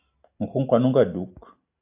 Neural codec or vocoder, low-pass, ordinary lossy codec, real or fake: none; 3.6 kHz; AAC, 32 kbps; real